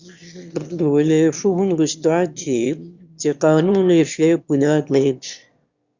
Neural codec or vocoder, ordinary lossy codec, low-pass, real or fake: autoencoder, 22.05 kHz, a latent of 192 numbers a frame, VITS, trained on one speaker; Opus, 64 kbps; 7.2 kHz; fake